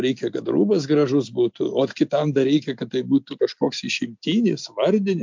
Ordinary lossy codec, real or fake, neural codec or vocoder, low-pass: MP3, 64 kbps; real; none; 7.2 kHz